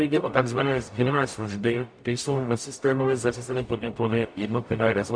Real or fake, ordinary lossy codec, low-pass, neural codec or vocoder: fake; MP3, 64 kbps; 9.9 kHz; codec, 44.1 kHz, 0.9 kbps, DAC